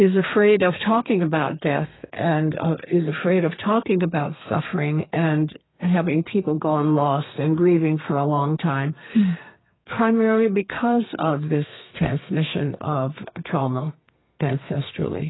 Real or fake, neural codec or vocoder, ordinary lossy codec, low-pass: fake; codec, 32 kHz, 1.9 kbps, SNAC; AAC, 16 kbps; 7.2 kHz